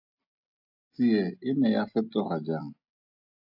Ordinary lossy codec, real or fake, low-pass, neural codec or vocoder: AAC, 48 kbps; real; 5.4 kHz; none